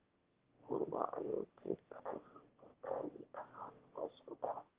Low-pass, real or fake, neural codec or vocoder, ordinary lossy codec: 3.6 kHz; fake; autoencoder, 22.05 kHz, a latent of 192 numbers a frame, VITS, trained on one speaker; Opus, 16 kbps